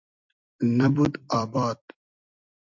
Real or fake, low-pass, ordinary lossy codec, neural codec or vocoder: fake; 7.2 kHz; MP3, 64 kbps; vocoder, 44.1 kHz, 128 mel bands every 256 samples, BigVGAN v2